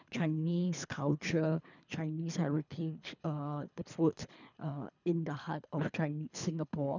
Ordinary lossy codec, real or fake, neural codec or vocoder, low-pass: none; fake; codec, 24 kHz, 3 kbps, HILCodec; 7.2 kHz